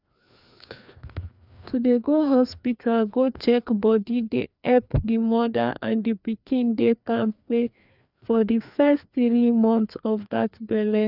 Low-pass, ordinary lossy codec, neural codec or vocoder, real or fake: 5.4 kHz; none; codec, 16 kHz, 2 kbps, FreqCodec, larger model; fake